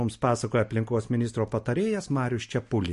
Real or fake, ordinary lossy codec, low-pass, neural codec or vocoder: real; MP3, 48 kbps; 14.4 kHz; none